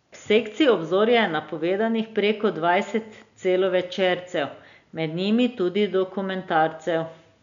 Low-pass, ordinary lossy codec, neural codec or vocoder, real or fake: 7.2 kHz; none; none; real